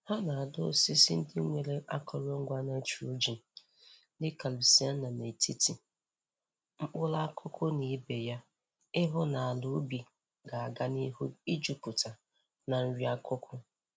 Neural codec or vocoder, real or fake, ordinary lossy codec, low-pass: none; real; none; none